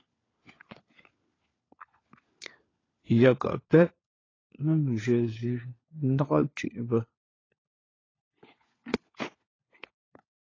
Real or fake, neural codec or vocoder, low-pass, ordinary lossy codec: fake; codec, 16 kHz, 4 kbps, FunCodec, trained on LibriTTS, 50 frames a second; 7.2 kHz; AAC, 32 kbps